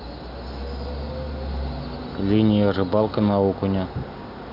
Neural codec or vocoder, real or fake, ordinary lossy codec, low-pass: none; real; none; 5.4 kHz